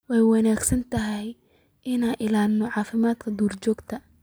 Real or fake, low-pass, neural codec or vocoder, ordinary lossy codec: real; none; none; none